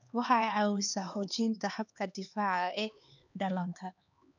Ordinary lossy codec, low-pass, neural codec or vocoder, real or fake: none; 7.2 kHz; codec, 16 kHz, 2 kbps, X-Codec, HuBERT features, trained on LibriSpeech; fake